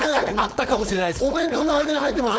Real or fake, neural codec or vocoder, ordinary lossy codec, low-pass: fake; codec, 16 kHz, 4.8 kbps, FACodec; none; none